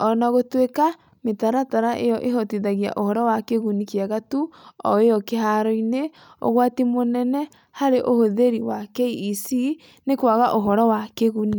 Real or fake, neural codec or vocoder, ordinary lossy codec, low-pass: real; none; none; none